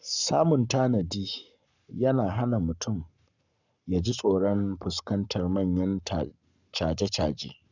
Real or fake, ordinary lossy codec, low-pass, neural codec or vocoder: fake; none; 7.2 kHz; codec, 44.1 kHz, 7.8 kbps, Pupu-Codec